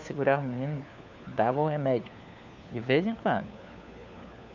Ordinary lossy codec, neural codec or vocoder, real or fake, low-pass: none; codec, 16 kHz, 4 kbps, FunCodec, trained on LibriTTS, 50 frames a second; fake; 7.2 kHz